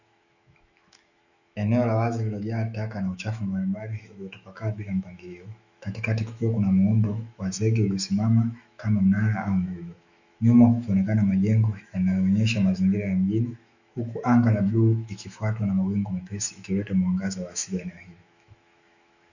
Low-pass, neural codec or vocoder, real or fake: 7.2 kHz; autoencoder, 48 kHz, 128 numbers a frame, DAC-VAE, trained on Japanese speech; fake